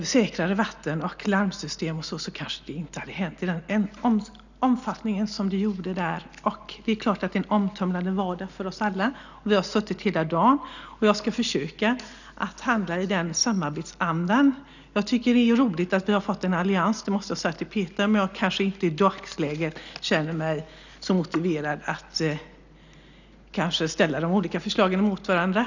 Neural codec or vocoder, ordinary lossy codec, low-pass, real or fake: none; none; 7.2 kHz; real